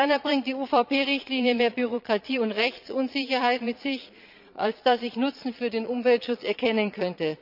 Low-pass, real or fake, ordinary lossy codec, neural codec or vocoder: 5.4 kHz; fake; none; vocoder, 22.05 kHz, 80 mel bands, Vocos